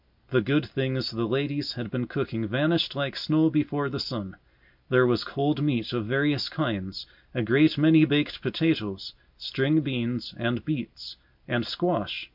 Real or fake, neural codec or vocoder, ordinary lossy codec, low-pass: real; none; MP3, 48 kbps; 5.4 kHz